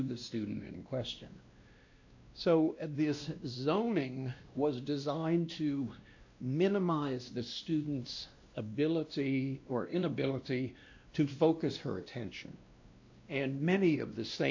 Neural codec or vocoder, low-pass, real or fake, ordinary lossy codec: codec, 16 kHz, 1 kbps, X-Codec, WavLM features, trained on Multilingual LibriSpeech; 7.2 kHz; fake; AAC, 48 kbps